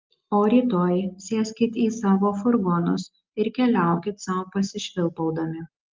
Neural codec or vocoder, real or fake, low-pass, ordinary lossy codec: none; real; 7.2 kHz; Opus, 32 kbps